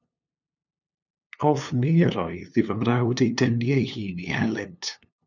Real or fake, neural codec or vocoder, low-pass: fake; codec, 16 kHz, 2 kbps, FunCodec, trained on LibriTTS, 25 frames a second; 7.2 kHz